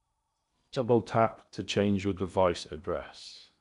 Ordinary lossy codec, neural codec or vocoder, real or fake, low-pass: none; codec, 16 kHz in and 24 kHz out, 0.8 kbps, FocalCodec, streaming, 65536 codes; fake; 10.8 kHz